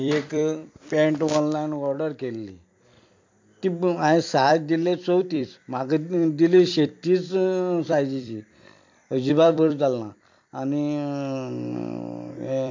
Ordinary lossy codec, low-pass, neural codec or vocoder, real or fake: MP3, 48 kbps; 7.2 kHz; vocoder, 44.1 kHz, 128 mel bands every 256 samples, BigVGAN v2; fake